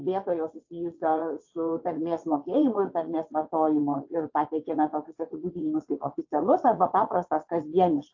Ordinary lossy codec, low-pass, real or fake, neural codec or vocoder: AAC, 48 kbps; 7.2 kHz; fake; vocoder, 22.05 kHz, 80 mel bands, WaveNeXt